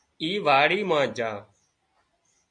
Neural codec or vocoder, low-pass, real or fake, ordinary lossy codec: none; 9.9 kHz; real; MP3, 48 kbps